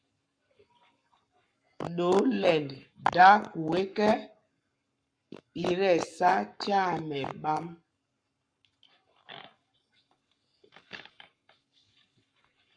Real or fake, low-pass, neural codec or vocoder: fake; 9.9 kHz; codec, 44.1 kHz, 7.8 kbps, Pupu-Codec